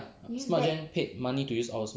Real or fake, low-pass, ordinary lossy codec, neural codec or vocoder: real; none; none; none